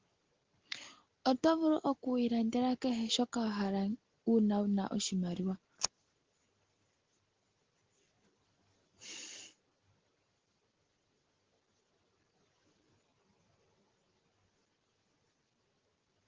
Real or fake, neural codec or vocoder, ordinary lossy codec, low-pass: real; none; Opus, 16 kbps; 7.2 kHz